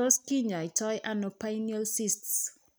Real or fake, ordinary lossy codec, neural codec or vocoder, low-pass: real; none; none; none